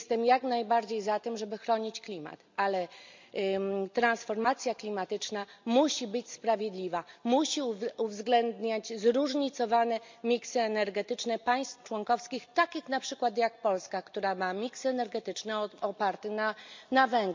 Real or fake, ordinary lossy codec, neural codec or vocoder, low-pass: real; MP3, 64 kbps; none; 7.2 kHz